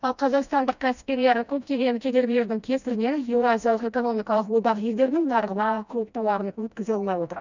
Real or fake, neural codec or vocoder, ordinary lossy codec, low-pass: fake; codec, 16 kHz, 1 kbps, FreqCodec, smaller model; none; 7.2 kHz